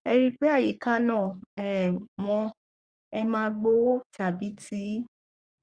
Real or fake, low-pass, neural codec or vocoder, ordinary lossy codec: fake; 9.9 kHz; codec, 44.1 kHz, 3.4 kbps, Pupu-Codec; Opus, 64 kbps